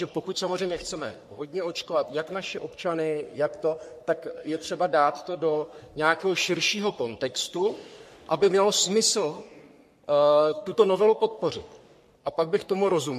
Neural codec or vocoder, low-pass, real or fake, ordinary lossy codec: codec, 44.1 kHz, 3.4 kbps, Pupu-Codec; 14.4 kHz; fake; MP3, 64 kbps